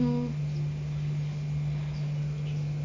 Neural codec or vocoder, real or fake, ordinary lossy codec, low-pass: none; real; MP3, 48 kbps; 7.2 kHz